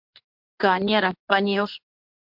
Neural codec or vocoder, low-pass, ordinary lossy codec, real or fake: codec, 24 kHz, 0.9 kbps, WavTokenizer, medium speech release version 1; 5.4 kHz; MP3, 48 kbps; fake